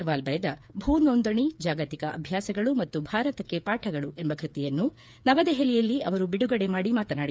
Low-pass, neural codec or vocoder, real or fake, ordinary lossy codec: none; codec, 16 kHz, 8 kbps, FreqCodec, smaller model; fake; none